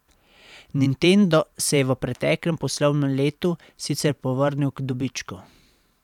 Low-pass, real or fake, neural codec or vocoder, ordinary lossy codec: 19.8 kHz; fake; vocoder, 44.1 kHz, 128 mel bands every 512 samples, BigVGAN v2; none